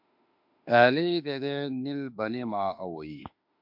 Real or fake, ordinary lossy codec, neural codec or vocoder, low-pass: fake; MP3, 48 kbps; autoencoder, 48 kHz, 32 numbers a frame, DAC-VAE, trained on Japanese speech; 5.4 kHz